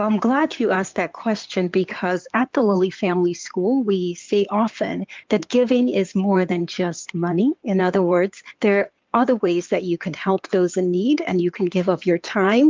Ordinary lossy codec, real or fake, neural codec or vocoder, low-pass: Opus, 32 kbps; fake; codec, 16 kHz in and 24 kHz out, 2.2 kbps, FireRedTTS-2 codec; 7.2 kHz